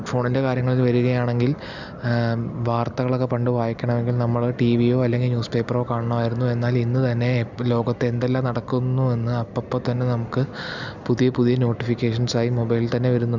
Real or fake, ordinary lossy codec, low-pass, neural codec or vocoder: real; none; 7.2 kHz; none